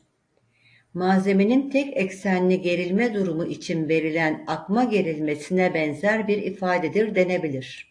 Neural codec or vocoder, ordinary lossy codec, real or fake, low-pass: none; AAC, 48 kbps; real; 9.9 kHz